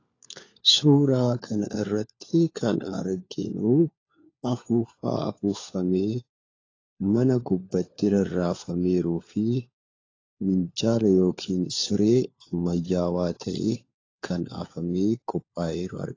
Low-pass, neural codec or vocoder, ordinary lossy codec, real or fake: 7.2 kHz; codec, 16 kHz, 4 kbps, FunCodec, trained on LibriTTS, 50 frames a second; AAC, 32 kbps; fake